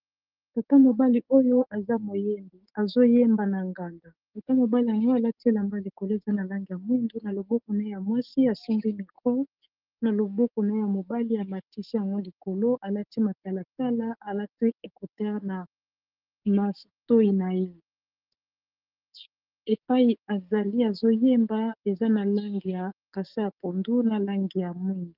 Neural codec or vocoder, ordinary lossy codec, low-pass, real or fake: codec, 16 kHz, 6 kbps, DAC; Opus, 32 kbps; 5.4 kHz; fake